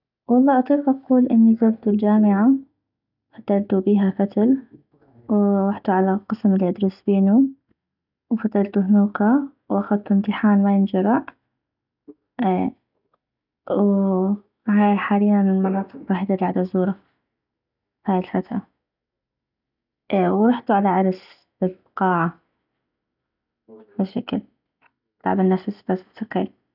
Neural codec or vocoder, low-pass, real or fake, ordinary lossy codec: none; 5.4 kHz; real; none